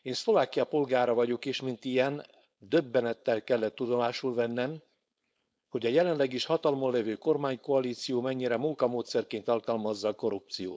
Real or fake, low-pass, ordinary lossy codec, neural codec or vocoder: fake; none; none; codec, 16 kHz, 4.8 kbps, FACodec